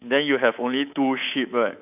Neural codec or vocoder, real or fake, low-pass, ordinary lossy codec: codec, 24 kHz, 3.1 kbps, DualCodec; fake; 3.6 kHz; none